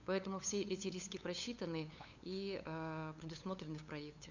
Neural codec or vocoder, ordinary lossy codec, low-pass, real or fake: codec, 16 kHz, 8 kbps, FunCodec, trained on LibriTTS, 25 frames a second; none; 7.2 kHz; fake